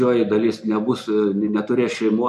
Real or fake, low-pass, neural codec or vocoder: real; 14.4 kHz; none